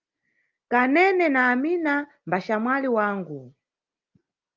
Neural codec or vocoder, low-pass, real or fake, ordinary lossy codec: none; 7.2 kHz; real; Opus, 24 kbps